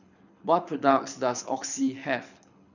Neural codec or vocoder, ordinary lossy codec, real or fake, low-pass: codec, 24 kHz, 6 kbps, HILCodec; none; fake; 7.2 kHz